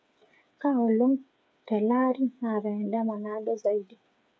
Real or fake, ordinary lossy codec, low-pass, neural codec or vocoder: fake; none; none; codec, 16 kHz, 8 kbps, FreqCodec, smaller model